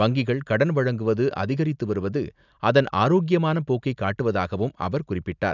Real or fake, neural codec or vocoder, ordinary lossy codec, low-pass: real; none; none; 7.2 kHz